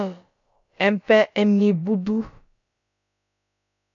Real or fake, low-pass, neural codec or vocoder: fake; 7.2 kHz; codec, 16 kHz, about 1 kbps, DyCAST, with the encoder's durations